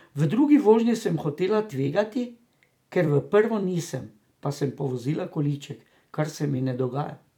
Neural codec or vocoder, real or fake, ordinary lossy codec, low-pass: vocoder, 44.1 kHz, 128 mel bands every 256 samples, BigVGAN v2; fake; none; 19.8 kHz